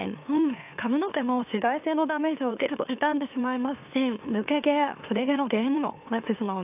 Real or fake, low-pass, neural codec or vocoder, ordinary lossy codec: fake; 3.6 kHz; autoencoder, 44.1 kHz, a latent of 192 numbers a frame, MeloTTS; AAC, 32 kbps